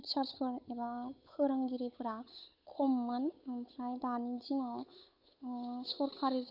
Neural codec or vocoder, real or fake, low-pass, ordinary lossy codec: codec, 16 kHz, 8 kbps, FunCodec, trained on Chinese and English, 25 frames a second; fake; 5.4 kHz; none